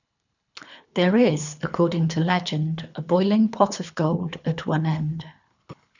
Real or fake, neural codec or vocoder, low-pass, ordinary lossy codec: fake; codec, 24 kHz, 3 kbps, HILCodec; 7.2 kHz; none